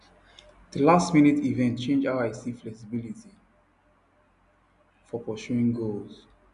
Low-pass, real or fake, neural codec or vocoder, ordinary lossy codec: 10.8 kHz; real; none; none